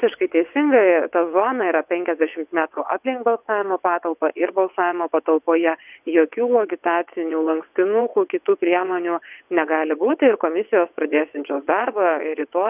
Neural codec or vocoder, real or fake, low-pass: vocoder, 22.05 kHz, 80 mel bands, WaveNeXt; fake; 3.6 kHz